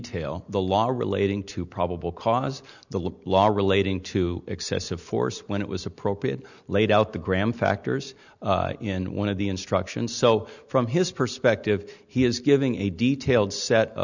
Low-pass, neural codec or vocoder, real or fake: 7.2 kHz; none; real